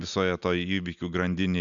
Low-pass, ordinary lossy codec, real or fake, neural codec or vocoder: 7.2 kHz; MP3, 96 kbps; real; none